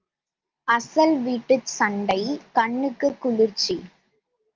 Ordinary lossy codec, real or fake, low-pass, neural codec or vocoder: Opus, 24 kbps; real; 7.2 kHz; none